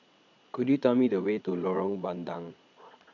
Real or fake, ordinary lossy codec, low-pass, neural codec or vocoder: fake; none; 7.2 kHz; vocoder, 22.05 kHz, 80 mel bands, Vocos